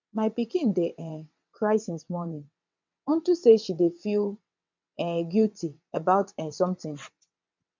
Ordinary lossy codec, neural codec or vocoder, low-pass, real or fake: MP3, 64 kbps; vocoder, 22.05 kHz, 80 mel bands, WaveNeXt; 7.2 kHz; fake